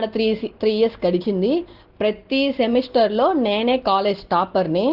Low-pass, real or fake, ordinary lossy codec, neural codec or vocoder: 5.4 kHz; real; Opus, 16 kbps; none